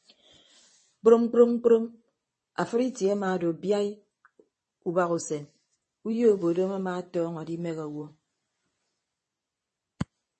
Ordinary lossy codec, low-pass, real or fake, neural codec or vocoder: MP3, 32 kbps; 10.8 kHz; fake; vocoder, 24 kHz, 100 mel bands, Vocos